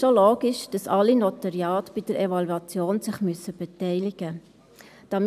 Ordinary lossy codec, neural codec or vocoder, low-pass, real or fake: none; none; 14.4 kHz; real